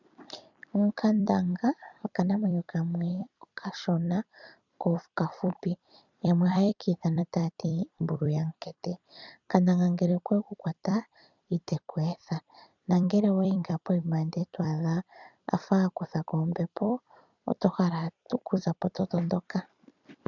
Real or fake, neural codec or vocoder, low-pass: fake; vocoder, 44.1 kHz, 128 mel bands every 256 samples, BigVGAN v2; 7.2 kHz